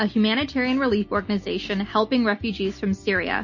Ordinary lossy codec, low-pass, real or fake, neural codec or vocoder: MP3, 32 kbps; 7.2 kHz; real; none